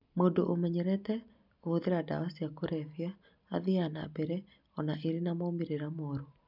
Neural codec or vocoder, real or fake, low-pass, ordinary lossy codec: none; real; 5.4 kHz; none